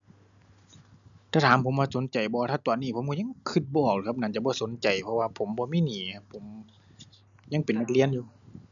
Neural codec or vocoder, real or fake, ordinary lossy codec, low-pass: none; real; none; 7.2 kHz